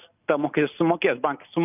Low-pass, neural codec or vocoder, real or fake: 3.6 kHz; none; real